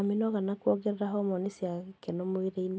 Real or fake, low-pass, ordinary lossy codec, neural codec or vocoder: real; none; none; none